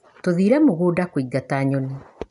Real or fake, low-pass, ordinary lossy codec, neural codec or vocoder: real; 10.8 kHz; none; none